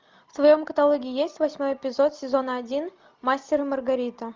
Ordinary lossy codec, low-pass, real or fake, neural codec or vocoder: Opus, 24 kbps; 7.2 kHz; real; none